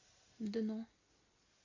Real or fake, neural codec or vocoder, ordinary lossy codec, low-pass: real; none; AAC, 32 kbps; 7.2 kHz